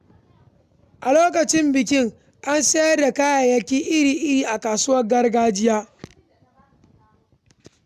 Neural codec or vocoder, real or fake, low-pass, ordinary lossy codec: none; real; 14.4 kHz; none